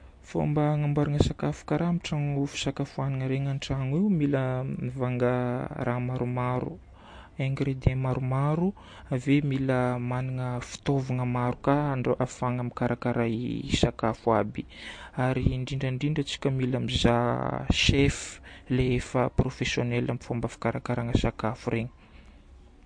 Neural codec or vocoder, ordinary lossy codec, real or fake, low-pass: none; MP3, 48 kbps; real; 9.9 kHz